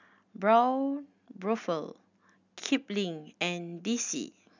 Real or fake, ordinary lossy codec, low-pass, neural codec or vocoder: real; none; 7.2 kHz; none